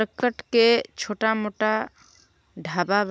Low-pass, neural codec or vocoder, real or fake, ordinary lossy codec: none; none; real; none